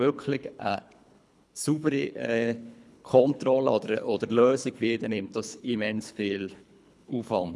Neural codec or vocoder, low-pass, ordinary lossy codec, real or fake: codec, 24 kHz, 3 kbps, HILCodec; none; none; fake